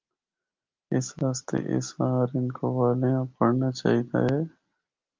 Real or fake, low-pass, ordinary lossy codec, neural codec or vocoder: real; 7.2 kHz; Opus, 24 kbps; none